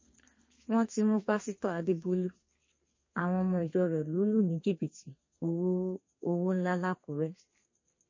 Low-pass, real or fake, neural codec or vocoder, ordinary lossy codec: 7.2 kHz; fake; codec, 32 kHz, 1.9 kbps, SNAC; MP3, 32 kbps